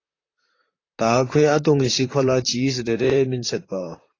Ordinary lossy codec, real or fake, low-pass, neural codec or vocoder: AAC, 32 kbps; fake; 7.2 kHz; vocoder, 44.1 kHz, 128 mel bands, Pupu-Vocoder